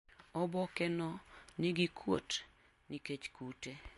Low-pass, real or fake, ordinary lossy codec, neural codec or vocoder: 14.4 kHz; real; MP3, 48 kbps; none